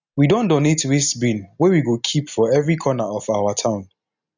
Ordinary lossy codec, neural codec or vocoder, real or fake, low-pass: none; none; real; 7.2 kHz